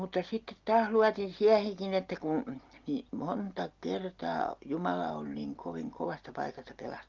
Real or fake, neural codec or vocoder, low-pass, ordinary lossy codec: real; none; 7.2 kHz; Opus, 32 kbps